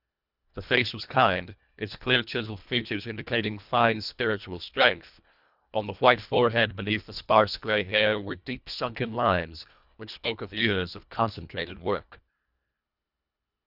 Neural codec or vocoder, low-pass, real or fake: codec, 24 kHz, 1.5 kbps, HILCodec; 5.4 kHz; fake